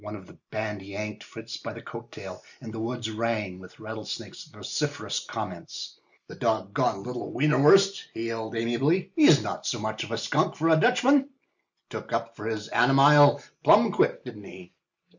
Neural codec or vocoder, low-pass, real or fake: none; 7.2 kHz; real